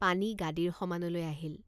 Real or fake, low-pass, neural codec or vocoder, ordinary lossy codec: real; 14.4 kHz; none; none